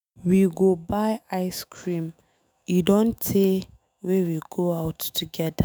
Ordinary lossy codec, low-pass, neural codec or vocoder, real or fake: none; none; autoencoder, 48 kHz, 128 numbers a frame, DAC-VAE, trained on Japanese speech; fake